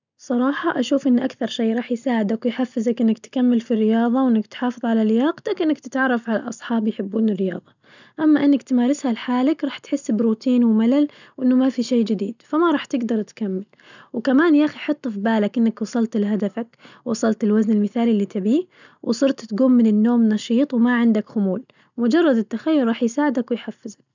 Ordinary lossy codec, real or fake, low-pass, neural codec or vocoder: none; real; 7.2 kHz; none